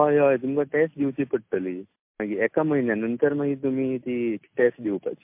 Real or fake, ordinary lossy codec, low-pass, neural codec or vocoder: real; MP3, 32 kbps; 3.6 kHz; none